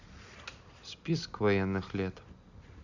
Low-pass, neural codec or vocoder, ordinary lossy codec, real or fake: 7.2 kHz; none; none; real